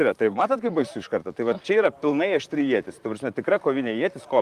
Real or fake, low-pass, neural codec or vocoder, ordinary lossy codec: fake; 14.4 kHz; vocoder, 44.1 kHz, 128 mel bands, Pupu-Vocoder; Opus, 32 kbps